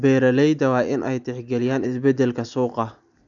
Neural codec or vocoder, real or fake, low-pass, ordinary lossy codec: none; real; 7.2 kHz; none